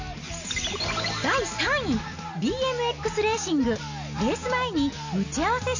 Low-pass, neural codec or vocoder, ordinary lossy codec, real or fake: 7.2 kHz; none; none; real